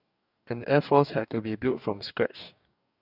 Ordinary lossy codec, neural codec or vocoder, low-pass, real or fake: none; codec, 44.1 kHz, 2.6 kbps, DAC; 5.4 kHz; fake